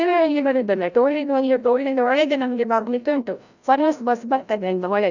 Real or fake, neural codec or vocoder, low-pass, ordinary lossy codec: fake; codec, 16 kHz, 0.5 kbps, FreqCodec, larger model; 7.2 kHz; none